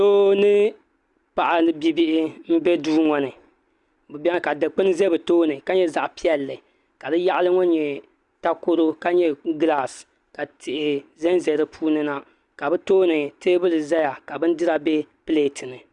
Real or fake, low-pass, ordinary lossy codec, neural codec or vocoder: real; 10.8 kHz; Opus, 64 kbps; none